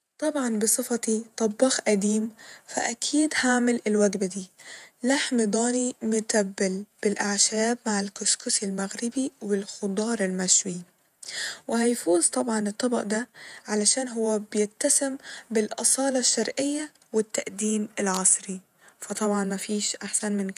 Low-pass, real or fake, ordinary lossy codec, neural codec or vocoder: 14.4 kHz; fake; none; vocoder, 48 kHz, 128 mel bands, Vocos